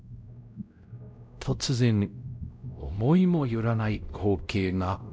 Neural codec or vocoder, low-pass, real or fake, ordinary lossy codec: codec, 16 kHz, 0.5 kbps, X-Codec, WavLM features, trained on Multilingual LibriSpeech; none; fake; none